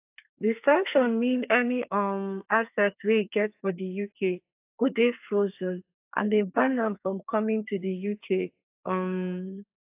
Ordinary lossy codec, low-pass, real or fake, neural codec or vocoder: AAC, 32 kbps; 3.6 kHz; fake; codec, 32 kHz, 1.9 kbps, SNAC